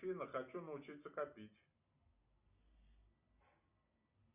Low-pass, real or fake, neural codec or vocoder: 3.6 kHz; real; none